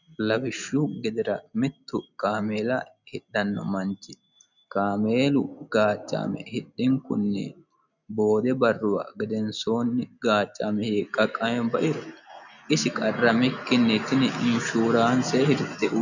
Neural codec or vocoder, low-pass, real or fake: none; 7.2 kHz; real